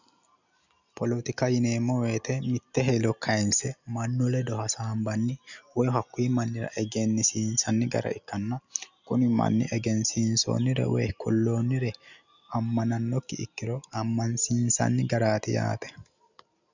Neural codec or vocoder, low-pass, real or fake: none; 7.2 kHz; real